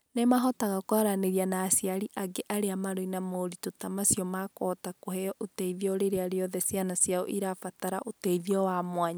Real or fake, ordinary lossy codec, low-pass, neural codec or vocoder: real; none; none; none